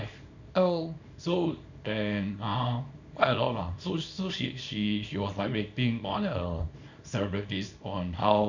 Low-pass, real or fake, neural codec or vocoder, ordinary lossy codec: 7.2 kHz; fake; codec, 24 kHz, 0.9 kbps, WavTokenizer, small release; none